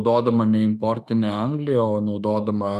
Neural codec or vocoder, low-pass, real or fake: autoencoder, 48 kHz, 32 numbers a frame, DAC-VAE, trained on Japanese speech; 14.4 kHz; fake